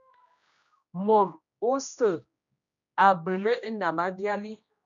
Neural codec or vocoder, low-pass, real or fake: codec, 16 kHz, 1 kbps, X-Codec, HuBERT features, trained on general audio; 7.2 kHz; fake